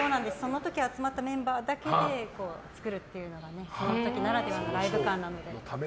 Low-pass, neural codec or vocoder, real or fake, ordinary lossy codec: none; none; real; none